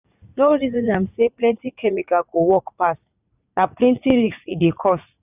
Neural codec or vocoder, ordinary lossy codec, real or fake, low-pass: vocoder, 44.1 kHz, 80 mel bands, Vocos; none; fake; 3.6 kHz